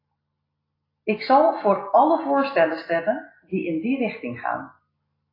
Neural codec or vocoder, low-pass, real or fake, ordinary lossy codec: none; 5.4 kHz; real; AAC, 24 kbps